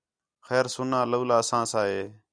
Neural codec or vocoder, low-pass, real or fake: none; 9.9 kHz; real